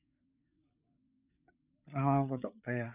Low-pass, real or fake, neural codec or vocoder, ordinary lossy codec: 3.6 kHz; fake; codec, 16 kHz, 8 kbps, FreqCodec, larger model; none